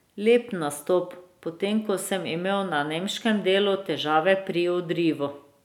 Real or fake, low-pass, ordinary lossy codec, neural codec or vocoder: real; 19.8 kHz; none; none